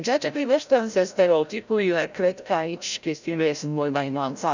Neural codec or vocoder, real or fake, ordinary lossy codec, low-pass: codec, 16 kHz, 0.5 kbps, FreqCodec, larger model; fake; none; 7.2 kHz